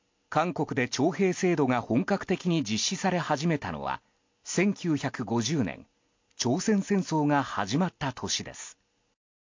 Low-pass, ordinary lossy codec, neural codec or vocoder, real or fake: 7.2 kHz; MP3, 48 kbps; none; real